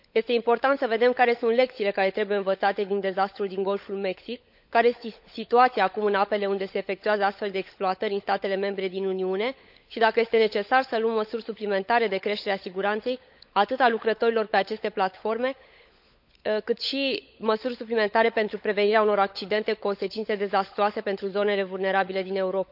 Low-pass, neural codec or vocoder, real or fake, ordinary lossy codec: 5.4 kHz; codec, 16 kHz, 16 kbps, FunCodec, trained on LibriTTS, 50 frames a second; fake; none